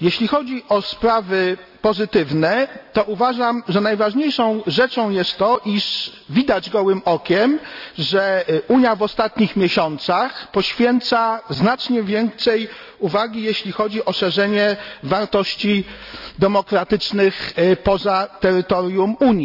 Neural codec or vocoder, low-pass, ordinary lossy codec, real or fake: none; 5.4 kHz; none; real